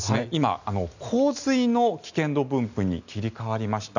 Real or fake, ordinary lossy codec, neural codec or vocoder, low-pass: real; none; none; 7.2 kHz